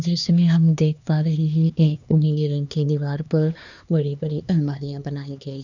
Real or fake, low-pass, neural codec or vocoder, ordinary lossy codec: fake; 7.2 kHz; codec, 16 kHz, 2 kbps, X-Codec, HuBERT features, trained on LibriSpeech; none